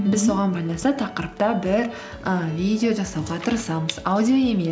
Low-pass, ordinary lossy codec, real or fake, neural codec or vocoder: none; none; real; none